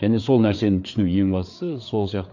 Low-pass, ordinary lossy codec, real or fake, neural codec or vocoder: 7.2 kHz; none; fake; codec, 16 kHz, 4 kbps, FreqCodec, larger model